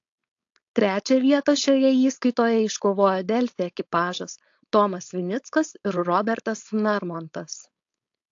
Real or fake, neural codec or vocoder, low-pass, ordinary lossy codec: fake; codec, 16 kHz, 4.8 kbps, FACodec; 7.2 kHz; AAC, 48 kbps